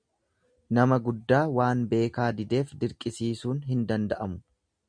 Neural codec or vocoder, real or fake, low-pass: none; real; 9.9 kHz